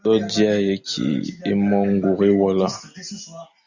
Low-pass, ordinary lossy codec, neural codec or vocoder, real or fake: 7.2 kHz; Opus, 64 kbps; none; real